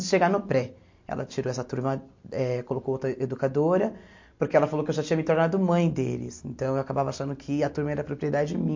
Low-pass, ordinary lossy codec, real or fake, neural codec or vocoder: 7.2 kHz; MP3, 48 kbps; real; none